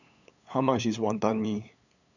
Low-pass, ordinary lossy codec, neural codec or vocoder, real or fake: 7.2 kHz; none; codec, 16 kHz, 8 kbps, FunCodec, trained on LibriTTS, 25 frames a second; fake